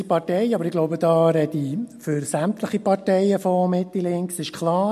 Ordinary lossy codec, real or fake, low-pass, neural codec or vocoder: MP3, 64 kbps; real; 14.4 kHz; none